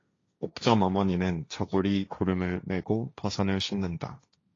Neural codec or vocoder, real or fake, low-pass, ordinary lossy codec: codec, 16 kHz, 1.1 kbps, Voila-Tokenizer; fake; 7.2 kHz; AAC, 32 kbps